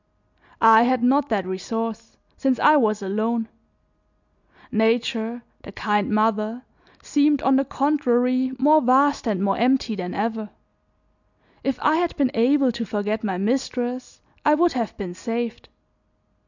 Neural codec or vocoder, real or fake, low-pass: none; real; 7.2 kHz